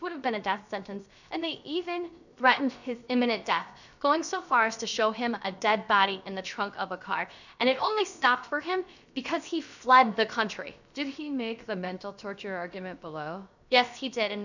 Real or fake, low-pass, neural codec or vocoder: fake; 7.2 kHz; codec, 16 kHz, 0.7 kbps, FocalCodec